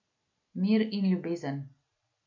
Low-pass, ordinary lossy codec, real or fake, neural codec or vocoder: 7.2 kHz; MP3, 48 kbps; real; none